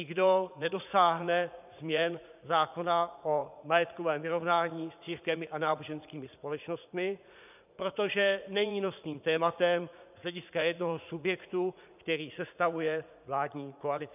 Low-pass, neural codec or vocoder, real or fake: 3.6 kHz; vocoder, 44.1 kHz, 80 mel bands, Vocos; fake